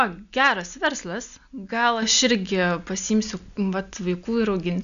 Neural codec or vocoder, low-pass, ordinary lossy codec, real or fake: none; 7.2 kHz; AAC, 96 kbps; real